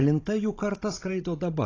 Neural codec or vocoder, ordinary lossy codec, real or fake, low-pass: codec, 16 kHz, 16 kbps, FunCodec, trained on Chinese and English, 50 frames a second; AAC, 32 kbps; fake; 7.2 kHz